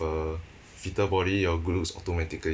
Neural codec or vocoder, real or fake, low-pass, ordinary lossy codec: none; real; none; none